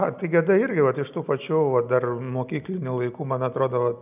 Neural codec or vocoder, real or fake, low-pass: none; real; 3.6 kHz